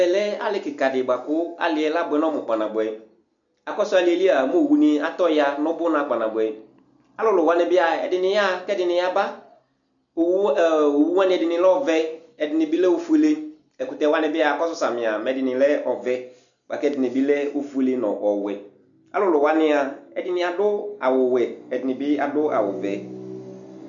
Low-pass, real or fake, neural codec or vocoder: 7.2 kHz; real; none